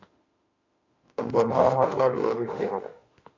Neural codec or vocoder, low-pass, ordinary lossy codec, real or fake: autoencoder, 48 kHz, 32 numbers a frame, DAC-VAE, trained on Japanese speech; 7.2 kHz; AAC, 48 kbps; fake